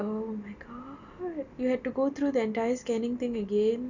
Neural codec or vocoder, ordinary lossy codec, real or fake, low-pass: none; none; real; 7.2 kHz